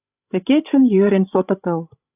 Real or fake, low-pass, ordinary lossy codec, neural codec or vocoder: fake; 3.6 kHz; AAC, 32 kbps; codec, 16 kHz, 16 kbps, FreqCodec, larger model